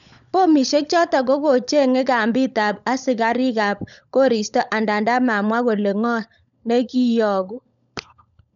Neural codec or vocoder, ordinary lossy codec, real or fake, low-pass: codec, 16 kHz, 16 kbps, FunCodec, trained on LibriTTS, 50 frames a second; none; fake; 7.2 kHz